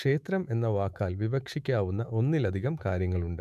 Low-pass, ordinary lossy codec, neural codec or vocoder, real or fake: 14.4 kHz; none; autoencoder, 48 kHz, 128 numbers a frame, DAC-VAE, trained on Japanese speech; fake